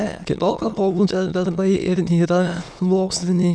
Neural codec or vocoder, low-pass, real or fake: autoencoder, 22.05 kHz, a latent of 192 numbers a frame, VITS, trained on many speakers; 9.9 kHz; fake